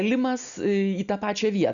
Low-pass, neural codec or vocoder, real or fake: 7.2 kHz; none; real